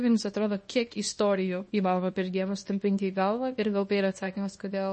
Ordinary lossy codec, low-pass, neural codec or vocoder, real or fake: MP3, 32 kbps; 10.8 kHz; codec, 24 kHz, 0.9 kbps, WavTokenizer, small release; fake